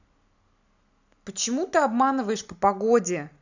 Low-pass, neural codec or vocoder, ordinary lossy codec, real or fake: 7.2 kHz; none; none; real